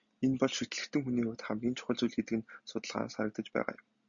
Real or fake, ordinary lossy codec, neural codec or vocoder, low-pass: real; MP3, 96 kbps; none; 7.2 kHz